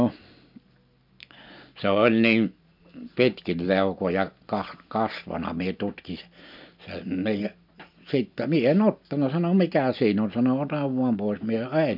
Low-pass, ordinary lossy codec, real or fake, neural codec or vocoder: 5.4 kHz; MP3, 48 kbps; real; none